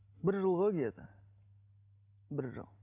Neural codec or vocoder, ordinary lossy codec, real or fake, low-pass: none; none; real; 3.6 kHz